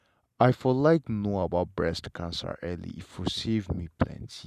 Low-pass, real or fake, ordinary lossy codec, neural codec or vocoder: 14.4 kHz; real; none; none